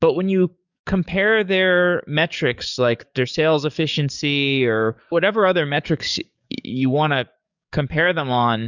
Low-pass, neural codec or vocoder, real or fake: 7.2 kHz; codec, 44.1 kHz, 7.8 kbps, DAC; fake